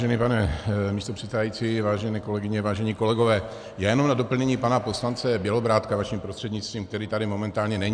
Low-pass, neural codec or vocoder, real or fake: 9.9 kHz; none; real